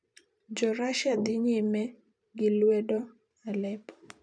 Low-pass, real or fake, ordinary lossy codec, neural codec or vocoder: 9.9 kHz; fake; none; vocoder, 44.1 kHz, 128 mel bands every 512 samples, BigVGAN v2